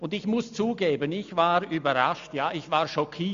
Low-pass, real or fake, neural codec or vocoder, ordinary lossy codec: 7.2 kHz; real; none; MP3, 64 kbps